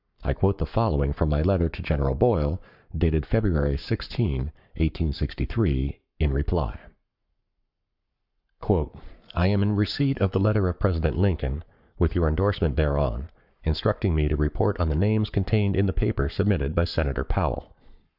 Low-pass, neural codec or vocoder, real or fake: 5.4 kHz; codec, 44.1 kHz, 7.8 kbps, Pupu-Codec; fake